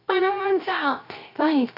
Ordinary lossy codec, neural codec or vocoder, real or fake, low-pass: none; codec, 16 kHz, 0.3 kbps, FocalCodec; fake; 5.4 kHz